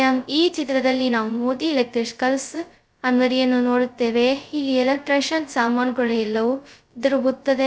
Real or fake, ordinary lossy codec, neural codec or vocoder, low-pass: fake; none; codec, 16 kHz, 0.2 kbps, FocalCodec; none